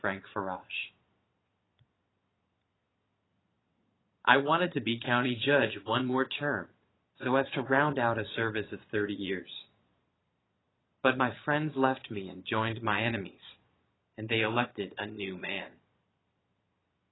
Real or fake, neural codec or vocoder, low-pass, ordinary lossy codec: fake; vocoder, 22.05 kHz, 80 mel bands, Vocos; 7.2 kHz; AAC, 16 kbps